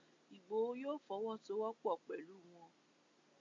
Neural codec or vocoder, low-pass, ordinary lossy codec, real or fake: none; 7.2 kHz; MP3, 48 kbps; real